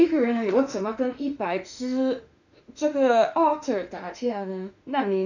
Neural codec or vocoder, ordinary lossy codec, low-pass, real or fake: autoencoder, 48 kHz, 32 numbers a frame, DAC-VAE, trained on Japanese speech; none; 7.2 kHz; fake